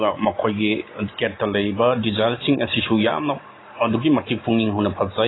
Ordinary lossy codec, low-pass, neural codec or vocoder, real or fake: AAC, 16 kbps; 7.2 kHz; none; real